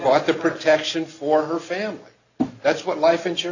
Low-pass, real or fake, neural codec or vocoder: 7.2 kHz; real; none